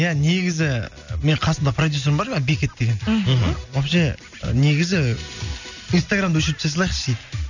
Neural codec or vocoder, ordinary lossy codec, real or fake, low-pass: none; none; real; 7.2 kHz